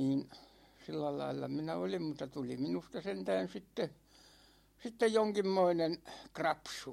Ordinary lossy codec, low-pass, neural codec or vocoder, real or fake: MP3, 64 kbps; 19.8 kHz; none; real